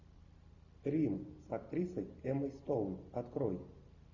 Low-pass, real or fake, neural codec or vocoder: 7.2 kHz; real; none